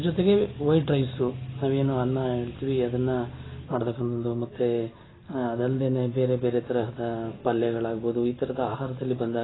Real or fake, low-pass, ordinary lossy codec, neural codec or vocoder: real; 7.2 kHz; AAC, 16 kbps; none